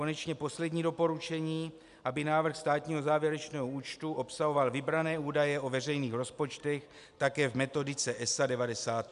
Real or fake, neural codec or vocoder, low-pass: real; none; 10.8 kHz